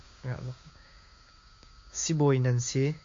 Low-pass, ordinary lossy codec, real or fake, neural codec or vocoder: 7.2 kHz; MP3, 48 kbps; fake; codec, 16 kHz, 6 kbps, DAC